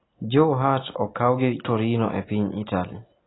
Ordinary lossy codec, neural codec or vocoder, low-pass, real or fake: AAC, 16 kbps; none; 7.2 kHz; real